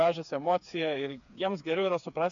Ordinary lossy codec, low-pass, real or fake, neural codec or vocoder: MP3, 64 kbps; 7.2 kHz; fake; codec, 16 kHz, 4 kbps, FreqCodec, smaller model